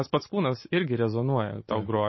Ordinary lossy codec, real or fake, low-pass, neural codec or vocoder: MP3, 24 kbps; real; 7.2 kHz; none